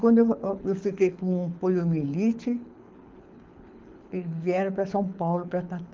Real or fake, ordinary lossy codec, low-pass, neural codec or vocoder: fake; Opus, 24 kbps; 7.2 kHz; codec, 24 kHz, 6 kbps, HILCodec